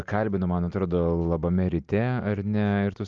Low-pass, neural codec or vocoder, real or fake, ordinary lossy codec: 7.2 kHz; none; real; Opus, 32 kbps